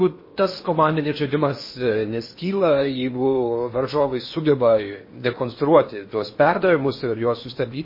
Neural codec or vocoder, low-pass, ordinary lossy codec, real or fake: codec, 16 kHz in and 24 kHz out, 0.8 kbps, FocalCodec, streaming, 65536 codes; 5.4 kHz; MP3, 24 kbps; fake